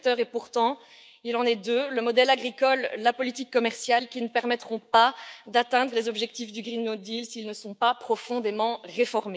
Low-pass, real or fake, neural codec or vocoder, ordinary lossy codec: none; fake; codec, 16 kHz, 6 kbps, DAC; none